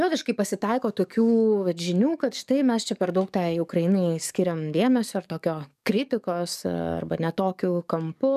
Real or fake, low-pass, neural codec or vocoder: fake; 14.4 kHz; codec, 44.1 kHz, 7.8 kbps, DAC